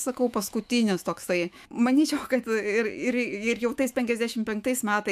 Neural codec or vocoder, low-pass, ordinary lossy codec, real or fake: autoencoder, 48 kHz, 128 numbers a frame, DAC-VAE, trained on Japanese speech; 14.4 kHz; AAC, 96 kbps; fake